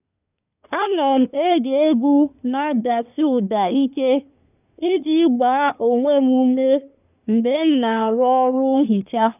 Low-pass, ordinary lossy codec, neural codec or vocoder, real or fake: 3.6 kHz; none; codec, 24 kHz, 1 kbps, SNAC; fake